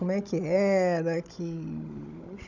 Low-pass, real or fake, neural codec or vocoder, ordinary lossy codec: 7.2 kHz; fake; codec, 16 kHz, 16 kbps, FunCodec, trained on Chinese and English, 50 frames a second; none